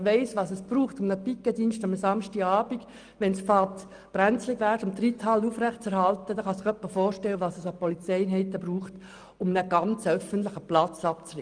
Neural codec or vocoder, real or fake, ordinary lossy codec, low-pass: none; real; Opus, 32 kbps; 9.9 kHz